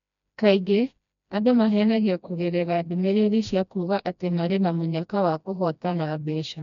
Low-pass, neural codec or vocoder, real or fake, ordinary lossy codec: 7.2 kHz; codec, 16 kHz, 1 kbps, FreqCodec, smaller model; fake; none